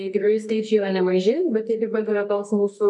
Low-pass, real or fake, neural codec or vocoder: 10.8 kHz; fake; codec, 24 kHz, 0.9 kbps, WavTokenizer, medium music audio release